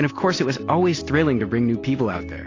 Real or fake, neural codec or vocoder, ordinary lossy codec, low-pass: real; none; AAC, 32 kbps; 7.2 kHz